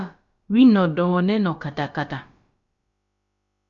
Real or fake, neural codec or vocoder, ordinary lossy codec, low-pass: fake; codec, 16 kHz, about 1 kbps, DyCAST, with the encoder's durations; Opus, 64 kbps; 7.2 kHz